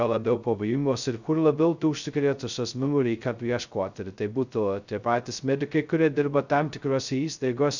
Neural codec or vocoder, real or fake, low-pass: codec, 16 kHz, 0.2 kbps, FocalCodec; fake; 7.2 kHz